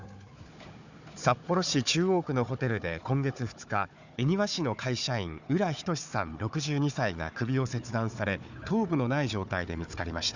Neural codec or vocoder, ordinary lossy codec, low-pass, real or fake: codec, 16 kHz, 4 kbps, FunCodec, trained on Chinese and English, 50 frames a second; none; 7.2 kHz; fake